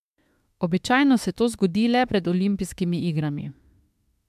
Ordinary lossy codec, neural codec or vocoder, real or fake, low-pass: MP3, 96 kbps; autoencoder, 48 kHz, 32 numbers a frame, DAC-VAE, trained on Japanese speech; fake; 14.4 kHz